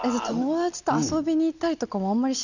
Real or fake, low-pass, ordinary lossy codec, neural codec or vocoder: real; 7.2 kHz; none; none